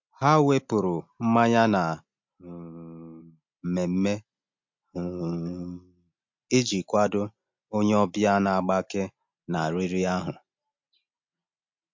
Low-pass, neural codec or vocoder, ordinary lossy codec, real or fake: 7.2 kHz; none; MP3, 48 kbps; real